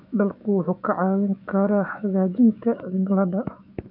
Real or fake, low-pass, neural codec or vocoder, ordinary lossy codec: fake; 5.4 kHz; autoencoder, 48 kHz, 128 numbers a frame, DAC-VAE, trained on Japanese speech; none